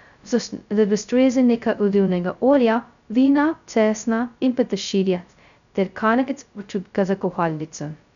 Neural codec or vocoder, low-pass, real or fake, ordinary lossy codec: codec, 16 kHz, 0.2 kbps, FocalCodec; 7.2 kHz; fake; none